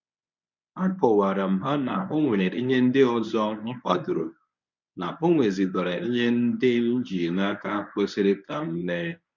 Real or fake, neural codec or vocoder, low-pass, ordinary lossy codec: fake; codec, 24 kHz, 0.9 kbps, WavTokenizer, medium speech release version 1; 7.2 kHz; none